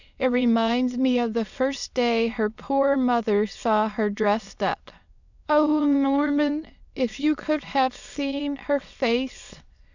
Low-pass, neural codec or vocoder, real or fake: 7.2 kHz; autoencoder, 22.05 kHz, a latent of 192 numbers a frame, VITS, trained on many speakers; fake